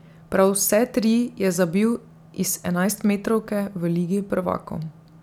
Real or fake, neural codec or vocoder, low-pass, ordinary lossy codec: real; none; 19.8 kHz; none